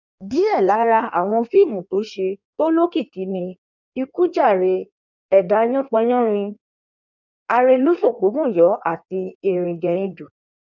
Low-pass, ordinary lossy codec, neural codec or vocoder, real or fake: 7.2 kHz; none; codec, 16 kHz in and 24 kHz out, 1.1 kbps, FireRedTTS-2 codec; fake